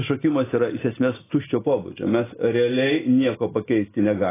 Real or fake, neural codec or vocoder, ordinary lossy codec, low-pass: real; none; AAC, 16 kbps; 3.6 kHz